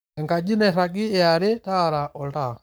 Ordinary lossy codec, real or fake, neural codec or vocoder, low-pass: none; fake; codec, 44.1 kHz, 7.8 kbps, Pupu-Codec; none